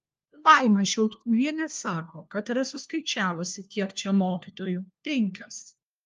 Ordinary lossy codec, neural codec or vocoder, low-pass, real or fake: Opus, 32 kbps; codec, 16 kHz, 1 kbps, FunCodec, trained on LibriTTS, 50 frames a second; 7.2 kHz; fake